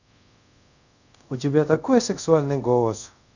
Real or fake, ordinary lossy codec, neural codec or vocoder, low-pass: fake; none; codec, 24 kHz, 0.5 kbps, DualCodec; 7.2 kHz